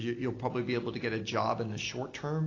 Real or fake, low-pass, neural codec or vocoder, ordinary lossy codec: real; 7.2 kHz; none; AAC, 32 kbps